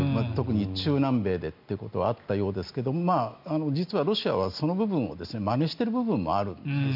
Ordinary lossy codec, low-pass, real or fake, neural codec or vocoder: none; 5.4 kHz; real; none